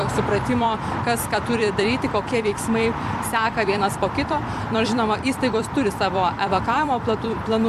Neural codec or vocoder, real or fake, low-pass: vocoder, 44.1 kHz, 128 mel bands every 256 samples, BigVGAN v2; fake; 14.4 kHz